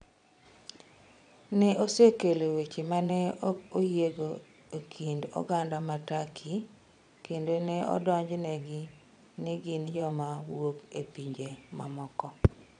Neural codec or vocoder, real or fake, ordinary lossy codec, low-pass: vocoder, 22.05 kHz, 80 mel bands, Vocos; fake; none; 9.9 kHz